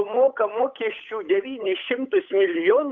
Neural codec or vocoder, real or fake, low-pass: codec, 16 kHz, 8 kbps, FunCodec, trained on Chinese and English, 25 frames a second; fake; 7.2 kHz